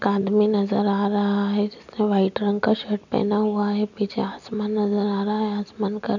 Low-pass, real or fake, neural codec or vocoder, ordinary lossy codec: 7.2 kHz; real; none; none